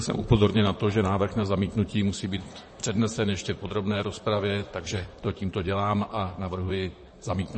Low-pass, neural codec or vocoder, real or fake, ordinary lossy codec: 10.8 kHz; vocoder, 44.1 kHz, 128 mel bands, Pupu-Vocoder; fake; MP3, 32 kbps